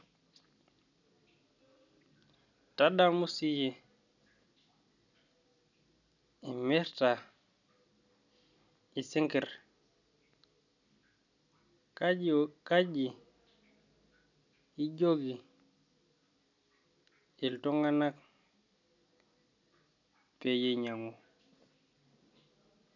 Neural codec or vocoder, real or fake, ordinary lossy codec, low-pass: none; real; none; 7.2 kHz